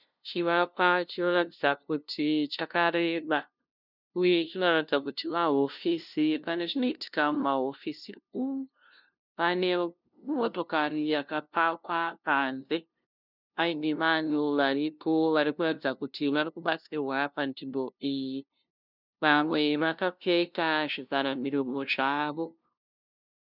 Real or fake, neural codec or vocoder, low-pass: fake; codec, 16 kHz, 0.5 kbps, FunCodec, trained on LibriTTS, 25 frames a second; 5.4 kHz